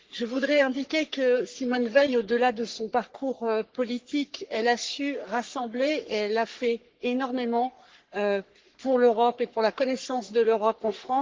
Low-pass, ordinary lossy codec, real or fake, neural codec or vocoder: 7.2 kHz; Opus, 16 kbps; fake; codec, 44.1 kHz, 3.4 kbps, Pupu-Codec